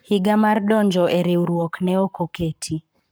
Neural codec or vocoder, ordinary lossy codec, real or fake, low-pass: codec, 44.1 kHz, 7.8 kbps, Pupu-Codec; none; fake; none